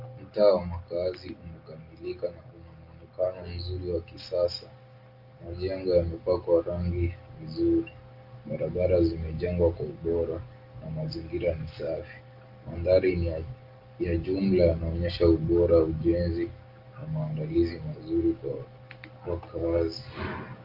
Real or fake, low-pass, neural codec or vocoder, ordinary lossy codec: real; 5.4 kHz; none; Opus, 32 kbps